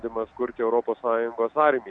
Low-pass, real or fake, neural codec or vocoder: 9.9 kHz; real; none